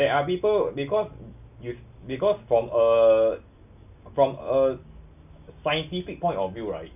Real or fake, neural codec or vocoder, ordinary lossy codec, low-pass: real; none; none; 3.6 kHz